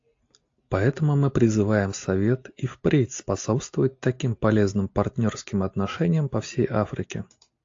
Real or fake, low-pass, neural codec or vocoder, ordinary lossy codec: real; 7.2 kHz; none; AAC, 48 kbps